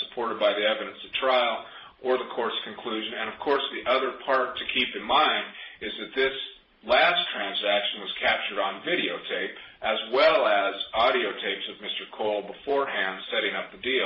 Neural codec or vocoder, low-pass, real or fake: vocoder, 44.1 kHz, 128 mel bands every 512 samples, BigVGAN v2; 5.4 kHz; fake